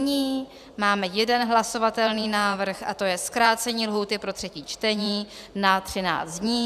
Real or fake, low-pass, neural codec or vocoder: fake; 14.4 kHz; vocoder, 44.1 kHz, 128 mel bands every 512 samples, BigVGAN v2